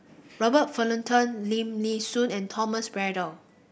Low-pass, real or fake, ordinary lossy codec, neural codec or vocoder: none; real; none; none